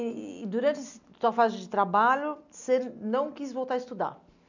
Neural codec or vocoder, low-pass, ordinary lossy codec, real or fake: none; 7.2 kHz; none; real